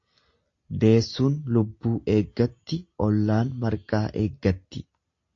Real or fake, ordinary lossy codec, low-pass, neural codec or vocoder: real; AAC, 48 kbps; 7.2 kHz; none